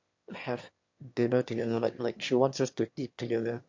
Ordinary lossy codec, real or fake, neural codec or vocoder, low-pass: MP3, 48 kbps; fake; autoencoder, 22.05 kHz, a latent of 192 numbers a frame, VITS, trained on one speaker; 7.2 kHz